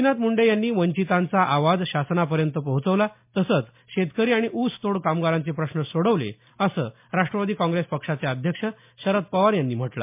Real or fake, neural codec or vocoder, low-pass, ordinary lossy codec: real; none; 3.6 kHz; MP3, 32 kbps